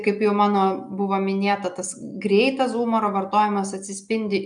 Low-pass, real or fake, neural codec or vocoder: 9.9 kHz; real; none